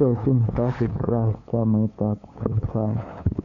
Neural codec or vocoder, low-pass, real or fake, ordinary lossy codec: codec, 16 kHz, 2 kbps, FunCodec, trained on LibriTTS, 25 frames a second; 7.2 kHz; fake; none